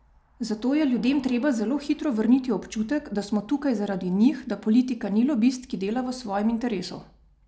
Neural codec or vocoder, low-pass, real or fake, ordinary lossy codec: none; none; real; none